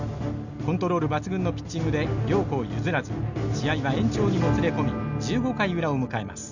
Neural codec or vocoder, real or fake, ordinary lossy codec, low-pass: none; real; none; 7.2 kHz